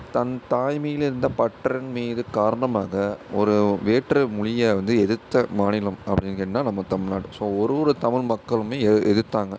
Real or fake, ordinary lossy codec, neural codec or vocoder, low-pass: real; none; none; none